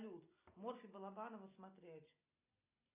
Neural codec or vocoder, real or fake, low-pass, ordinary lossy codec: none; real; 3.6 kHz; AAC, 32 kbps